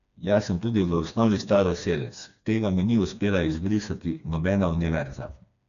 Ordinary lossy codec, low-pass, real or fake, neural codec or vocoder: none; 7.2 kHz; fake; codec, 16 kHz, 2 kbps, FreqCodec, smaller model